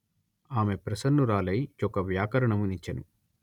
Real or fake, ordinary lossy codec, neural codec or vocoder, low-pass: real; none; none; 19.8 kHz